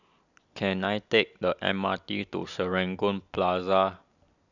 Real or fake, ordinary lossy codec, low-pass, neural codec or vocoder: real; none; 7.2 kHz; none